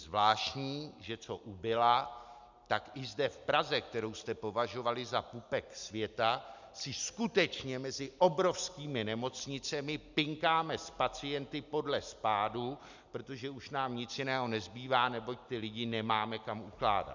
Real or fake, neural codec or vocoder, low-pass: real; none; 7.2 kHz